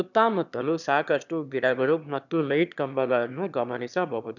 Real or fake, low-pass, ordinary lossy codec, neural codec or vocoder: fake; 7.2 kHz; none; autoencoder, 22.05 kHz, a latent of 192 numbers a frame, VITS, trained on one speaker